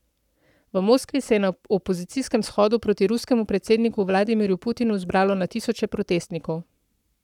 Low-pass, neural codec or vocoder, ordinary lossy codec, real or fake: 19.8 kHz; codec, 44.1 kHz, 7.8 kbps, Pupu-Codec; none; fake